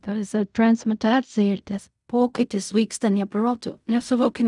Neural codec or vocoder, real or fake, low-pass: codec, 16 kHz in and 24 kHz out, 0.4 kbps, LongCat-Audio-Codec, fine tuned four codebook decoder; fake; 10.8 kHz